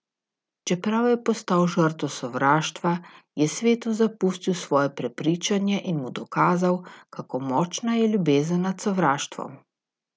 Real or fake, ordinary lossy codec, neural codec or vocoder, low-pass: real; none; none; none